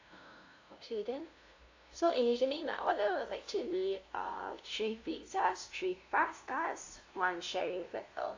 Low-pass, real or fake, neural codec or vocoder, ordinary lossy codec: 7.2 kHz; fake; codec, 16 kHz, 0.5 kbps, FunCodec, trained on LibriTTS, 25 frames a second; AAC, 48 kbps